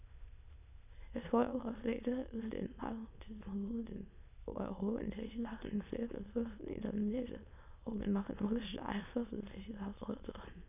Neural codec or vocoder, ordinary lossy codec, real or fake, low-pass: autoencoder, 22.05 kHz, a latent of 192 numbers a frame, VITS, trained on many speakers; none; fake; 3.6 kHz